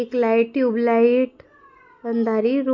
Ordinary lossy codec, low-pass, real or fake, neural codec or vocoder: MP3, 48 kbps; 7.2 kHz; real; none